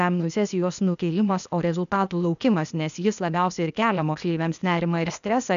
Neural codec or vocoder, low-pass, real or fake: codec, 16 kHz, 0.8 kbps, ZipCodec; 7.2 kHz; fake